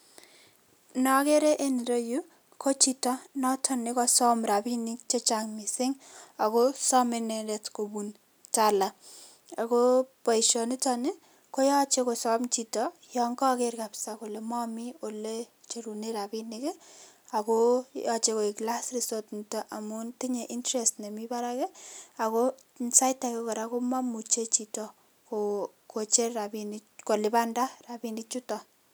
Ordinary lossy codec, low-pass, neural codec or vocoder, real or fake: none; none; none; real